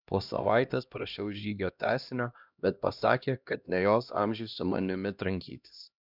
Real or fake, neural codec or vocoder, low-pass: fake; codec, 16 kHz, 1 kbps, X-Codec, HuBERT features, trained on LibriSpeech; 5.4 kHz